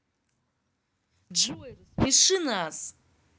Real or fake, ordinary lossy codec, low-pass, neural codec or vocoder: real; none; none; none